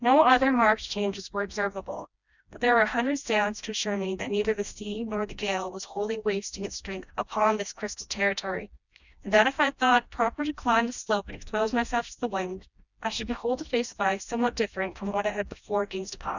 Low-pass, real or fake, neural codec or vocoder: 7.2 kHz; fake; codec, 16 kHz, 1 kbps, FreqCodec, smaller model